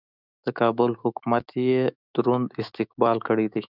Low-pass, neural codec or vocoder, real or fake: 5.4 kHz; autoencoder, 48 kHz, 128 numbers a frame, DAC-VAE, trained on Japanese speech; fake